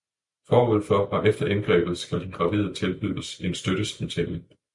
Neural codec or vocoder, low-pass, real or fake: none; 10.8 kHz; real